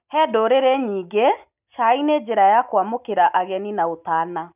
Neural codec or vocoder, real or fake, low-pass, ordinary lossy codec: none; real; 3.6 kHz; none